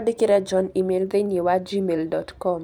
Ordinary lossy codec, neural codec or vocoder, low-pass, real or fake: none; vocoder, 44.1 kHz, 128 mel bands every 512 samples, BigVGAN v2; 19.8 kHz; fake